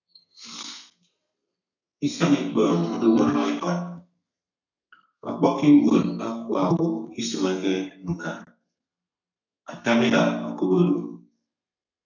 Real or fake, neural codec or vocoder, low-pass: fake; codec, 32 kHz, 1.9 kbps, SNAC; 7.2 kHz